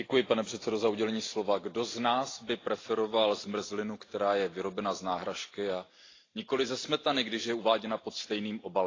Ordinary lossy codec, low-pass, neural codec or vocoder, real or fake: AAC, 32 kbps; 7.2 kHz; none; real